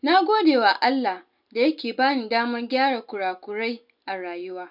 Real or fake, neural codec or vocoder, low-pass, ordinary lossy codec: real; none; 5.4 kHz; none